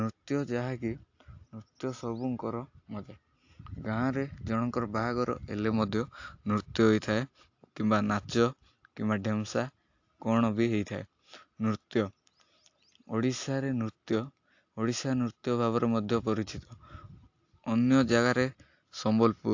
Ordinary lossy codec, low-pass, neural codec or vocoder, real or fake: AAC, 48 kbps; 7.2 kHz; none; real